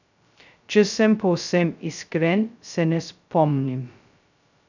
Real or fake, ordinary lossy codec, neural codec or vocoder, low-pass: fake; none; codec, 16 kHz, 0.2 kbps, FocalCodec; 7.2 kHz